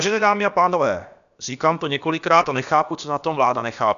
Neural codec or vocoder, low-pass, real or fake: codec, 16 kHz, about 1 kbps, DyCAST, with the encoder's durations; 7.2 kHz; fake